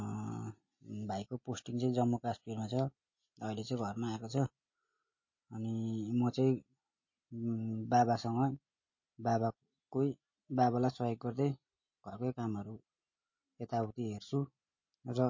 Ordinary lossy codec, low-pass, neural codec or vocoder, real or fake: MP3, 32 kbps; 7.2 kHz; none; real